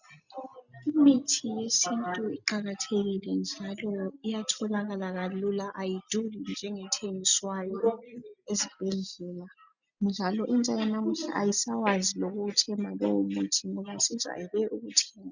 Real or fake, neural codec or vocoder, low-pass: real; none; 7.2 kHz